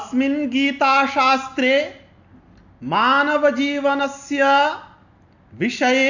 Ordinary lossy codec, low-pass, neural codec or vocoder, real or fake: none; 7.2 kHz; none; real